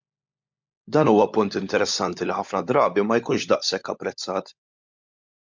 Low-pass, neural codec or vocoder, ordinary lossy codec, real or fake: 7.2 kHz; codec, 16 kHz, 16 kbps, FunCodec, trained on LibriTTS, 50 frames a second; MP3, 64 kbps; fake